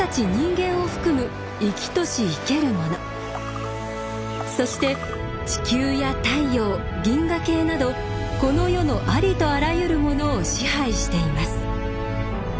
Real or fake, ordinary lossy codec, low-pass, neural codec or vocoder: real; none; none; none